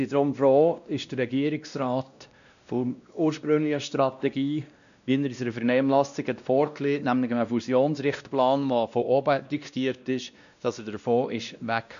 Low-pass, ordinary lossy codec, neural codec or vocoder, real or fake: 7.2 kHz; none; codec, 16 kHz, 1 kbps, X-Codec, WavLM features, trained on Multilingual LibriSpeech; fake